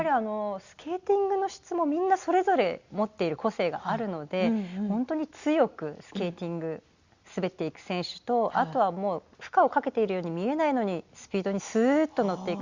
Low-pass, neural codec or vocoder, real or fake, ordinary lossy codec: 7.2 kHz; none; real; Opus, 64 kbps